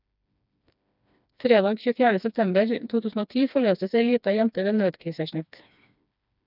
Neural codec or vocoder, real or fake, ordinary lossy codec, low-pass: codec, 16 kHz, 2 kbps, FreqCodec, smaller model; fake; none; 5.4 kHz